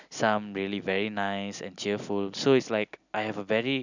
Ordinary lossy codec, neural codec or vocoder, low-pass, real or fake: none; none; 7.2 kHz; real